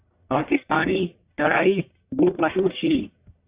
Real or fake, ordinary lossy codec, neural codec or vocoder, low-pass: fake; Opus, 64 kbps; codec, 44.1 kHz, 1.7 kbps, Pupu-Codec; 3.6 kHz